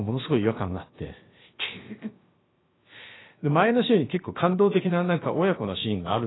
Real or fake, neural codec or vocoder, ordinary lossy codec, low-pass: fake; codec, 16 kHz, about 1 kbps, DyCAST, with the encoder's durations; AAC, 16 kbps; 7.2 kHz